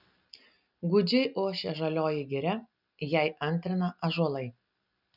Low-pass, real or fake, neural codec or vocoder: 5.4 kHz; real; none